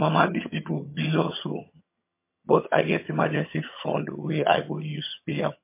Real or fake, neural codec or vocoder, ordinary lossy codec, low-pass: fake; vocoder, 22.05 kHz, 80 mel bands, HiFi-GAN; MP3, 24 kbps; 3.6 kHz